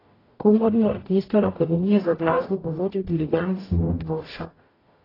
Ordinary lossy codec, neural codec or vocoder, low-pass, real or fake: AAC, 24 kbps; codec, 44.1 kHz, 0.9 kbps, DAC; 5.4 kHz; fake